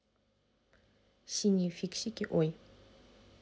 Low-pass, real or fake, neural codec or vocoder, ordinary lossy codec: none; real; none; none